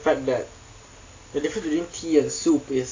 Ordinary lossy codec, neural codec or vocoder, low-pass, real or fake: AAC, 32 kbps; none; 7.2 kHz; real